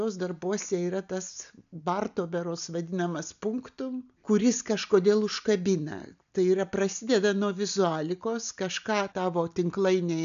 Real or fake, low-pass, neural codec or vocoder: real; 7.2 kHz; none